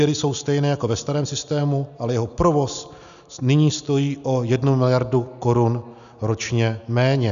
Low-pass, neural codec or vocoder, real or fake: 7.2 kHz; none; real